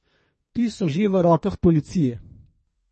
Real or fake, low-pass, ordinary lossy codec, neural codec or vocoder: fake; 10.8 kHz; MP3, 32 kbps; codec, 32 kHz, 1.9 kbps, SNAC